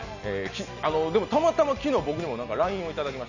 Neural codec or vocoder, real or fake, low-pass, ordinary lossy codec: none; real; 7.2 kHz; none